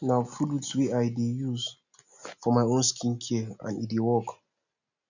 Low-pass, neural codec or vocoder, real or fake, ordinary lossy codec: 7.2 kHz; none; real; none